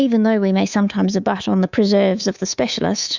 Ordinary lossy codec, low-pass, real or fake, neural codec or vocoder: Opus, 64 kbps; 7.2 kHz; fake; codec, 24 kHz, 3.1 kbps, DualCodec